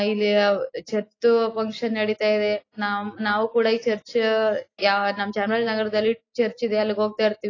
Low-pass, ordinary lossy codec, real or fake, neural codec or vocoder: 7.2 kHz; AAC, 32 kbps; real; none